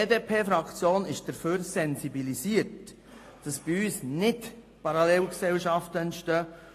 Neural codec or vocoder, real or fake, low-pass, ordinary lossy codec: none; real; 14.4 kHz; AAC, 48 kbps